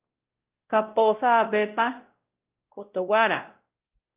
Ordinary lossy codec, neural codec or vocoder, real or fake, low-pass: Opus, 24 kbps; codec, 16 kHz, 0.5 kbps, X-Codec, WavLM features, trained on Multilingual LibriSpeech; fake; 3.6 kHz